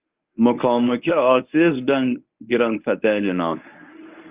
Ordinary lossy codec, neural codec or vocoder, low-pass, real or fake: Opus, 24 kbps; codec, 24 kHz, 0.9 kbps, WavTokenizer, medium speech release version 1; 3.6 kHz; fake